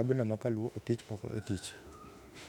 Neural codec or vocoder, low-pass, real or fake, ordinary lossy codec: autoencoder, 48 kHz, 32 numbers a frame, DAC-VAE, trained on Japanese speech; 19.8 kHz; fake; none